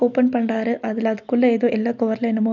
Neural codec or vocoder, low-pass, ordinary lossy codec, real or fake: none; 7.2 kHz; none; real